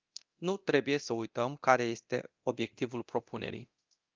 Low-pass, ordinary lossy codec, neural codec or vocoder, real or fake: 7.2 kHz; Opus, 24 kbps; codec, 24 kHz, 0.9 kbps, DualCodec; fake